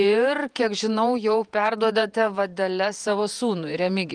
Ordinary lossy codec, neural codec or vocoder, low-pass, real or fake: Opus, 32 kbps; vocoder, 48 kHz, 128 mel bands, Vocos; 9.9 kHz; fake